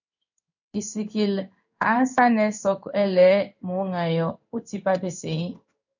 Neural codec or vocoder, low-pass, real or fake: codec, 16 kHz in and 24 kHz out, 1 kbps, XY-Tokenizer; 7.2 kHz; fake